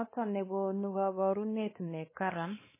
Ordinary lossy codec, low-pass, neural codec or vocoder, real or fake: MP3, 16 kbps; 3.6 kHz; codec, 16 kHz, 2 kbps, X-Codec, WavLM features, trained on Multilingual LibriSpeech; fake